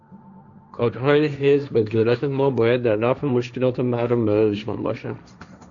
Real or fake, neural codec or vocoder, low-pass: fake; codec, 16 kHz, 1.1 kbps, Voila-Tokenizer; 7.2 kHz